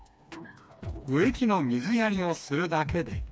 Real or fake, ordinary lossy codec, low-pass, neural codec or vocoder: fake; none; none; codec, 16 kHz, 2 kbps, FreqCodec, smaller model